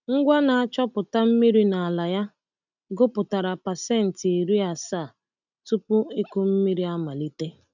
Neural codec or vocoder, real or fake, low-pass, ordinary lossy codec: none; real; 7.2 kHz; none